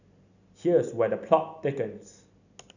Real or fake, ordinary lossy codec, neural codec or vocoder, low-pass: real; none; none; 7.2 kHz